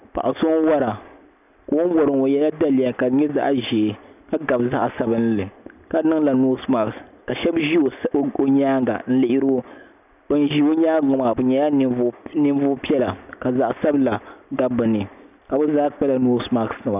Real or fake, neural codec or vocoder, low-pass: real; none; 3.6 kHz